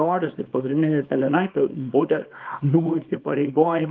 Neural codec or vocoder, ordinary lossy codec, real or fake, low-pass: codec, 24 kHz, 0.9 kbps, WavTokenizer, small release; Opus, 32 kbps; fake; 7.2 kHz